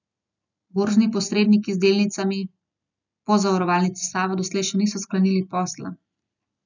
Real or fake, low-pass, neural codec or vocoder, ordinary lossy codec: real; 7.2 kHz; none; none